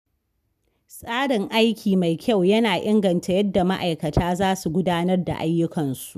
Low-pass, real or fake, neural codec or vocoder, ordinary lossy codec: 14.4 kHz; real; none; none